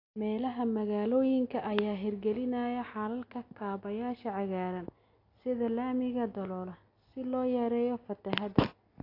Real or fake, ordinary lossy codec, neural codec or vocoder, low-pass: real; AAC, 32 kbps; none; 5.4 kHz